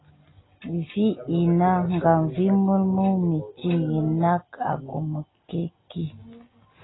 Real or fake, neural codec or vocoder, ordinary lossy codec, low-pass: real; none; AAC, 16 kbps; 7.2 kHz